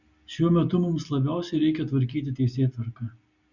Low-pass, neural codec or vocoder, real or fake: 7.2 kHz; none; real